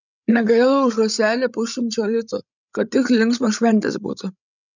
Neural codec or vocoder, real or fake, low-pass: codec, 16 kHz, 16 kbps, FreqCodec, larger model; fake; 7.2 kHz